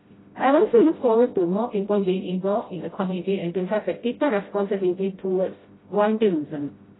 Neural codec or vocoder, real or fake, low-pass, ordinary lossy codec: codec, 16 kHz, 0.5 kbps, FreqCodec, smaller model; fake; 7.2 kHz; AAC, 16 kbps